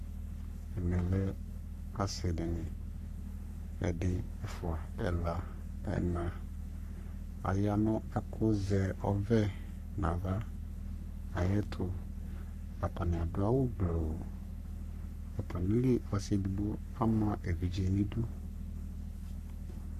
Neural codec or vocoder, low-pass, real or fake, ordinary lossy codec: codec, 44.1 kHz, 3.4 kbps, Pupu-Codec; 14.4 kHz; fake; MP3, 96 kbps